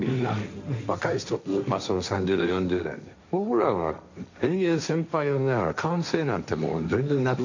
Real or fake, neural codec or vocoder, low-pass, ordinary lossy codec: fake; codec, 16 kHz, 1.1 kbps, Voila-Tokenizer; 7.2 kHz; none